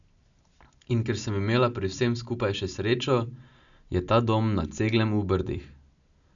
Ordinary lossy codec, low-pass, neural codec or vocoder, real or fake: none; 7.2 kHz; none; real